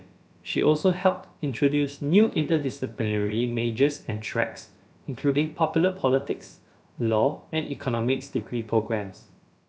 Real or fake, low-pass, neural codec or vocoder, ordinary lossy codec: fake; none; codec, 16 kHz, about 1 kbps, DyCAST, with the encoder's durations; none